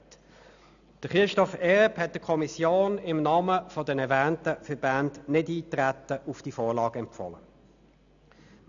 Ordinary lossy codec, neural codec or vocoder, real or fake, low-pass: none; none; real; 7.2 kHz